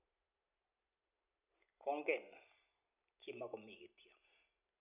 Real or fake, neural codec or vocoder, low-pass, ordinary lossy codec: real; none; 3.6 kHz; none